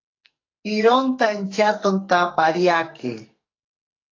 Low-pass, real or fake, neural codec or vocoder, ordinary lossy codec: 7.2 kHz; fake; codec, 44.1 kHz, 2.6 kbps, SNAC; AAC, 32 kbps